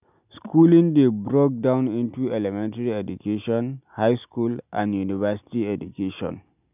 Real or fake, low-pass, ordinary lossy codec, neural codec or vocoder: real; 3.6 kHz; none; none